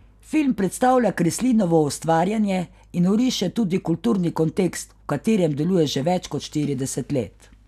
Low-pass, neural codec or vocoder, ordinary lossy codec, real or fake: 14.4 kHz; none; none; real